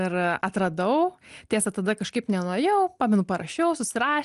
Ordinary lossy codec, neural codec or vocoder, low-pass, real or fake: Opus, 32 kbps; none; 10.8 kHz; real